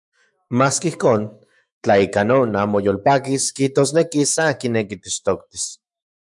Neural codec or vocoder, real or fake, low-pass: autoencoder, 48 kHz, 128 numbers a frame, DAC-VAE, trained on Japanese speech; fake; 10.8 kHz